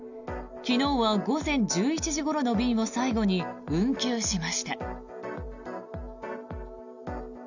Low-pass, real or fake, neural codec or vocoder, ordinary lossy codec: 7.2 kHz; real; none; none